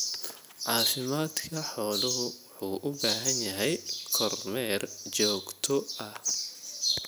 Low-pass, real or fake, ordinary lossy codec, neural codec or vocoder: none; real; none; none